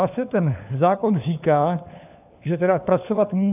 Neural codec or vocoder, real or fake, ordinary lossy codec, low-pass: codec, 16 kHz, 4 kbps, FunCodec, trained on LibriTTS, 50 frames a second; fake; AAC, 32 kbps; 3.6 kHz